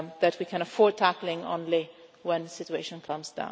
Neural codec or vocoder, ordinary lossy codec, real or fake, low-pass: none; none; real; none